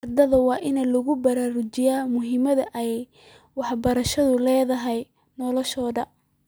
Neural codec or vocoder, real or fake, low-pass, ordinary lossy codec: none; real; none; none